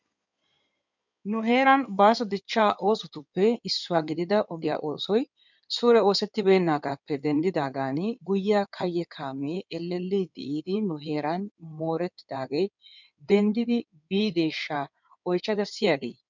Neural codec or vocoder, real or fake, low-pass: codec, 16 kHz in and 24 kHz out, 2.2 kbps, FireRedTTS-2 codec; fake; 7.2 kHz